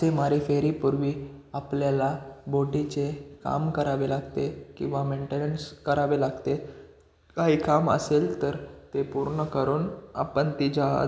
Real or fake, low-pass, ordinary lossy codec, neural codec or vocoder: real; none; none; none